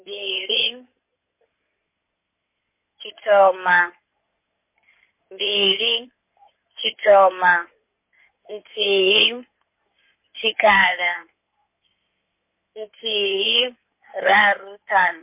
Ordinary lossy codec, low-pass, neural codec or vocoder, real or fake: MP3, 24 kbps; 3.6 kHz; codec, 16 kHz in and 24 kHz out, 2.2 kbps, FireRedTTS-2 codec; fake